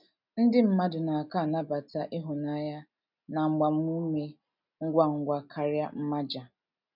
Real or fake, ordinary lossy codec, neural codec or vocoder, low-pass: real; none; none; 5.4 kHz